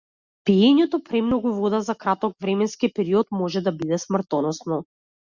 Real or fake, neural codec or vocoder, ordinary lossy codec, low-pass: real; none; Opus, 64 kbps; 7.2 kHz